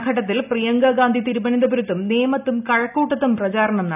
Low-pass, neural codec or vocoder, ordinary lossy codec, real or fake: 3.6 kHz; none; none; real